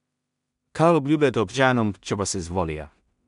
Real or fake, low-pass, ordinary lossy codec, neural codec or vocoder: fake; 10.8 kHz; none; codec, 16 kHz in and 24 kHz out, 0.4 kbps, LongCat-Audio-Codec, two codebook decoder